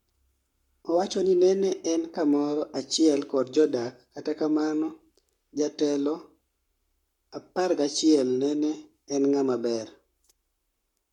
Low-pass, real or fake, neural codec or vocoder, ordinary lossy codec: 19.8 kHz; fake; codec, 44.1 kHz, 7.8 kbps, Pupu-Codec; none